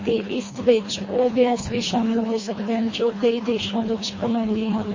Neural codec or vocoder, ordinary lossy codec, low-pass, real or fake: codec, 24 kHz, 1.5 kbps, HILCodec; MP3, 32 kbps; 7.2 kHz; fake